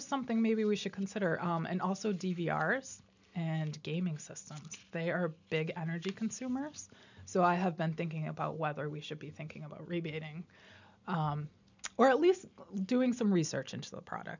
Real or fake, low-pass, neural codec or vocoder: real; 7.2 kHz; none